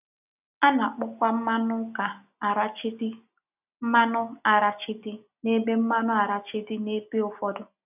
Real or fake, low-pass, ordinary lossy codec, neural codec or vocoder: real; 3.6 kHz; none; none